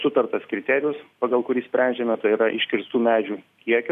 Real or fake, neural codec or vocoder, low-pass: real; none; 14.4 kHz